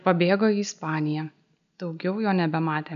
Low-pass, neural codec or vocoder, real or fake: 7.2 kHz; none; real